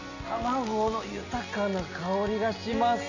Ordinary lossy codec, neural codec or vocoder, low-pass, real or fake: none; none; 7.2 kHz; real